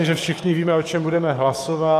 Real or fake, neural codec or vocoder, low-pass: fake; codec, 44.1 kHz, 7.8 kbps, DAC; 14.4 kHz